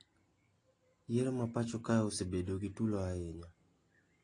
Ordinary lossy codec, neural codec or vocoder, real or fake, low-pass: AAC, 32 kbps; none; real; 9.9 kHz